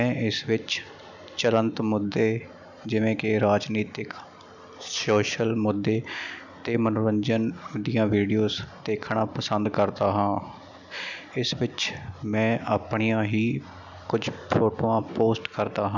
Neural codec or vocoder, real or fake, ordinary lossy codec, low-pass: none; real; none; 7.2 kHz